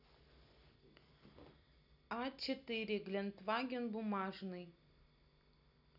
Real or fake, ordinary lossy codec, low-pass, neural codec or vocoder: real; none; 5.4 kHz; none